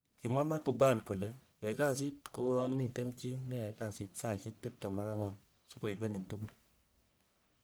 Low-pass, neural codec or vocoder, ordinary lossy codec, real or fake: none; codec, 44.1 kHz, 1.7 kbps, Pupu-Codec; none; fake